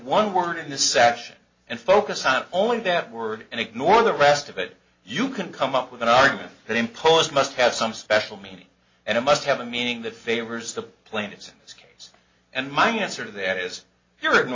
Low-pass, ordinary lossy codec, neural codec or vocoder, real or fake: 7.2 kHz; MP3, 32 kbps; none; real